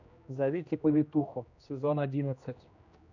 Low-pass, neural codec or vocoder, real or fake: 7.2 kHz; codec, 16 kHz, 1 kbps, X-Codec, HuBERT features, trained on general audio; fake